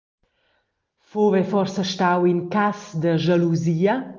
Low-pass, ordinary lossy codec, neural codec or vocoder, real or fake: 7.2 kHz; Opus, 32 kbps; none; real